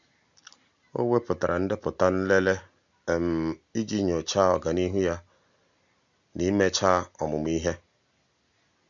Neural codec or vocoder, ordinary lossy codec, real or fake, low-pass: none; none; real; 7.2 kHz